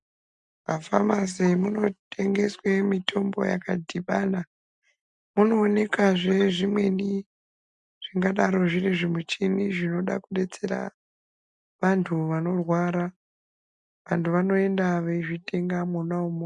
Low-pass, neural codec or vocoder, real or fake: 10.8 kHz; none; real